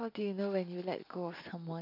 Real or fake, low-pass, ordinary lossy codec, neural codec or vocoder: real; 5.4 kHz; AAC, 32 kbps; none